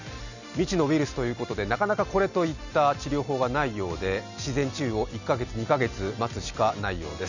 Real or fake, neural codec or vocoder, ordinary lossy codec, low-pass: real; none; none; 7.2 kHz